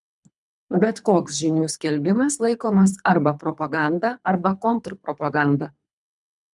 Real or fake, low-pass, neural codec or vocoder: fake; 10.8 kHz; codec, 24 kHz, 3 kbps, HILCodec